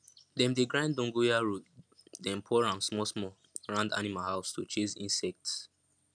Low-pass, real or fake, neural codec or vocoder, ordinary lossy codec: 9.9 kHz; real; none; none